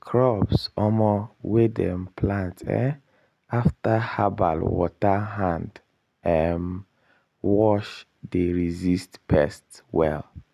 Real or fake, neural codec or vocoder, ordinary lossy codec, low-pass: real; none; none; 14.4 kHz